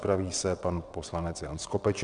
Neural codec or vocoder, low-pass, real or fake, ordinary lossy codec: vocoder, 22.05 kHz, 80 mel bands, WaveNeXt; 9.9 kHz; fake; Opus, 64 kbps